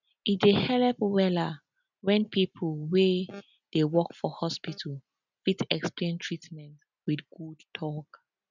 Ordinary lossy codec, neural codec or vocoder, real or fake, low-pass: none; none; real; 7.2 kHz